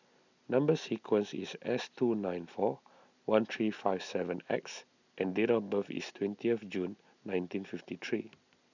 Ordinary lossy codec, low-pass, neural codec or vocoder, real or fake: none; 7.2 kHz; none; real